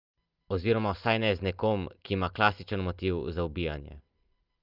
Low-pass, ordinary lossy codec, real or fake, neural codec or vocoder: 5.4 kHz; Opus, 32 kbps; real; none